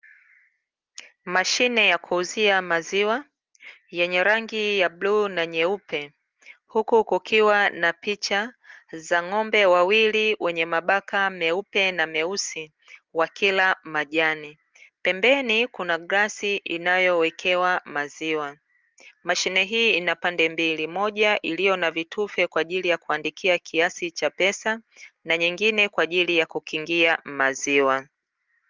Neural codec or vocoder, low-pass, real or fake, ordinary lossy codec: none; 7.2 kHz; real; Opus, 24 kbps